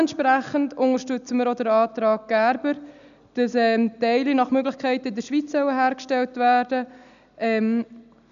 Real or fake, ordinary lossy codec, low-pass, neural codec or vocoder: real; none; 7.2 kHz; none